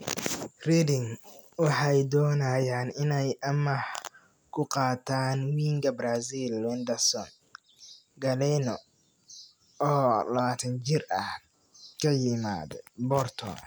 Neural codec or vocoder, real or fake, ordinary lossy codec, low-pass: none; real; none; none